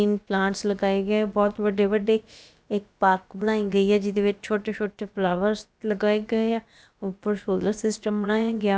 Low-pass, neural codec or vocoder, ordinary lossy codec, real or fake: none; codec, 16 kHz, about 1 kbps, DyCAST, with the encoder's durations; none; fake